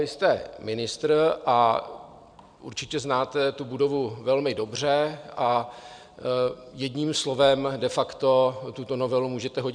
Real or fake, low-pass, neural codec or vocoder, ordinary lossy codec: real; 9.9 kHz; none; MP3, 96 kbps